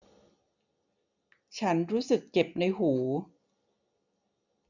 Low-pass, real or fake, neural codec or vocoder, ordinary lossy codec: 7.2 kHz; real; none; none